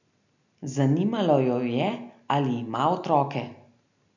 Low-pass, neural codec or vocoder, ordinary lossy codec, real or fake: 7.2 kHz; none; none; real